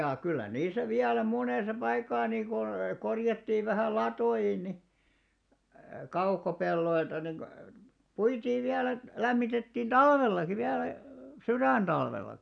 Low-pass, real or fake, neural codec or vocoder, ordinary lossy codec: 9.9 kHz; real; none; none